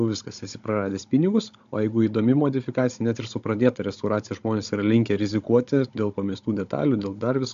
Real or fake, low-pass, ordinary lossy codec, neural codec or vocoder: fake; 7.2 kHz; MP3, 64 kbps; codec, 16 kHz, 16 kbps, FunCodec, trained on Chinese and English, 50 frames a second